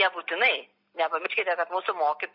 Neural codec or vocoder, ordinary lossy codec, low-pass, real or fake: none; MP3, 48 kbps; 5.4 kHz; real